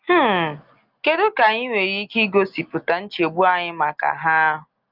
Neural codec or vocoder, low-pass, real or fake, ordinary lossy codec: none; 5.4 kHz; real; Opus, 24 kbps